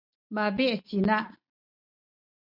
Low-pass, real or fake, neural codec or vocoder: 5.4 kHz; real; none